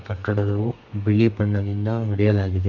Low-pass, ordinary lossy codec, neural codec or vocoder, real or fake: 7.2 kHz; none; codec, 32 kHz, 1.9 kbps, SNAC; fake